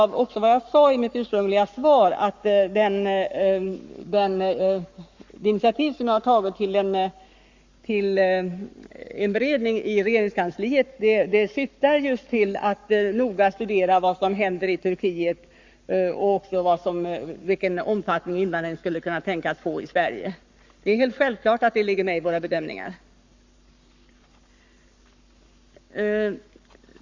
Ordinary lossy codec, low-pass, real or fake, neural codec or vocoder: Opus, 64 kbps; 7.2 kHz; fake; codec, 44.1 kHz, 7.8 kbps, Pupu-Codec